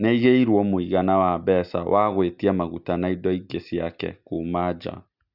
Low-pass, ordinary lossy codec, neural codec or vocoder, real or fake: 5.4 kHz; Opus, 64 kbps; none; real